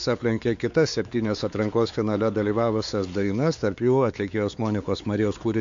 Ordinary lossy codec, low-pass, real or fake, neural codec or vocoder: AAC, 64 kbps; 7.2 kHz; fake; codec, 16 kHz, 4 kbps, X-Codec, WavLM features, trained on Multilingual LibriSpeech